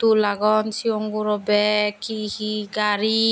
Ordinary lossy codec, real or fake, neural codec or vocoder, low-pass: none; real; none; none